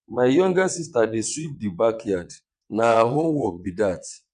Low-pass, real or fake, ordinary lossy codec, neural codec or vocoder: 9.9 kHz; fake; none; vocoder, 22.05 kHz, 80 mel bands, WaveNeXt